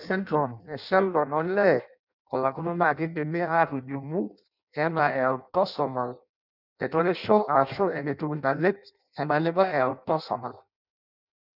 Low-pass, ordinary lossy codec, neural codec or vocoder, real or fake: 5.4 kHz; none; codec, 16 kHz in and 24 kHz out, 0.6 kbps, FireRedTTS-2 codec; fake